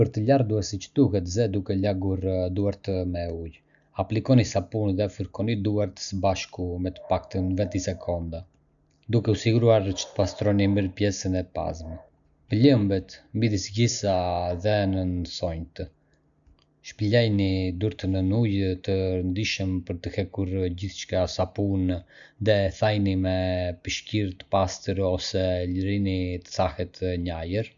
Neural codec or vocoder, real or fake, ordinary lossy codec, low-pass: none; real; none; 7.2 kHz